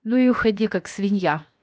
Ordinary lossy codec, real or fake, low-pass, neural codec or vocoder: none; fake; none; codec, 16 kHz, 0.7 kbps, FocalCodec